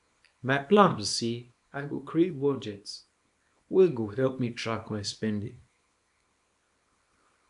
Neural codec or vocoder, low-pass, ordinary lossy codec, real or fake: codec, 24 kHz, 0.9 kbps, WavTokenizer, small release; 10.8 kHz; none; fake